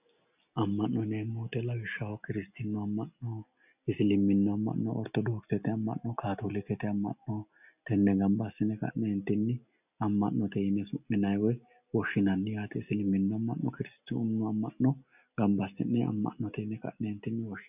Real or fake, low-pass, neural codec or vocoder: real; 3.6 kHz; none